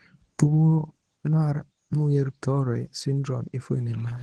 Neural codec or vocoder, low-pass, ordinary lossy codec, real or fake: codec, 24 kHz, 0.9 kbps, WavTokenizer, medium speech release version 1; 10.8 kHz; Opus, 16 kbps; fake